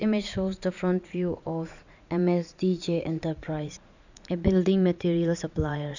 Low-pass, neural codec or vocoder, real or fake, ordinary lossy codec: 7.2 kHz; vocoder, 44.1 kHz, 80 mel bands, Vocos; fake; none